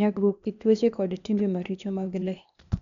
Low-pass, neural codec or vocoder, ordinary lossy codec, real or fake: 7.2 kHz; codec, 16 kHz, 0.8 kbps, ZipCodec; none; fake